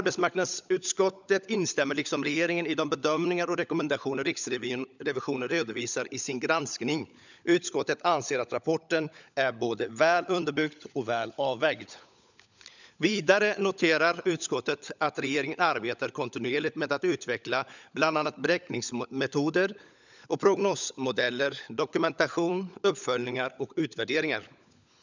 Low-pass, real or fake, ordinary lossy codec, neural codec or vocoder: 7.2 kHz; fake; none; codec, 16 kHz, 16 kbps, FunCodec, trained on LibriTTS, 50 frames a second